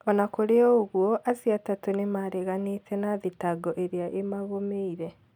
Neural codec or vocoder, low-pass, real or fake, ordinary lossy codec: none; 19.8 kHz; real; none